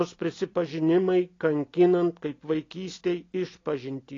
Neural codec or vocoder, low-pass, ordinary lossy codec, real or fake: none; 7.2 kHz; AAC, 32 kbps; real